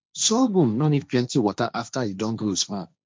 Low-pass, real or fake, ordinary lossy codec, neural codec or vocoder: none; fake; none; codec, 16 kHz, 1.1 kbps, Voila-Tokenizer